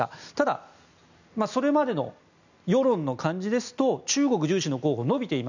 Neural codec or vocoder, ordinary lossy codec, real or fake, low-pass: none; none; real; 7.2 kHz